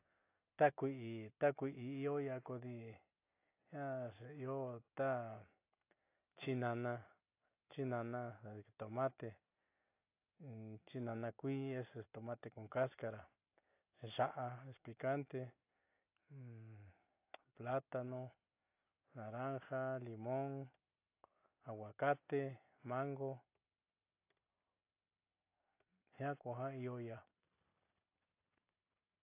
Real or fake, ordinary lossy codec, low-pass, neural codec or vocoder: real; AAC, 24 kbps; 3.6 kHz; none